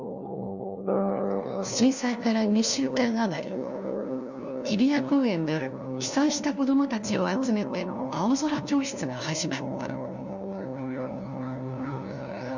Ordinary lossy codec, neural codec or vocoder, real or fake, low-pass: none; codec, 16 kHz, 1 kbps, FunCodec, trained on LibriTTS, 50 frames a second; fake; 7.2 kHz